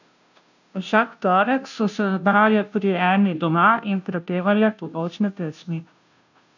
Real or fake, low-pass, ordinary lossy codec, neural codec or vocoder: fake; 7.2 kHz; none; codec, 16 kHz, 0.5 kbps, FunCodec, trained on Chinese and English, 25 frames a second